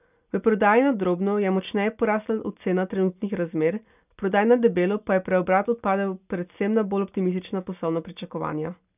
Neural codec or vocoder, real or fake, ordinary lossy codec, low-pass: none; real; none; 3.6 kHz